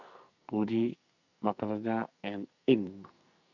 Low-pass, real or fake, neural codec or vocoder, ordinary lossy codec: 7.2 kHz; fake; codec, 44.1 kHz, 2.6 kbps, SNAC; none